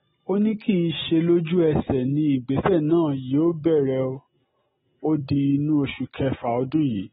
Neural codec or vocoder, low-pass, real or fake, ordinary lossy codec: none; 14.4 kHz; real; AAC, 16 kbps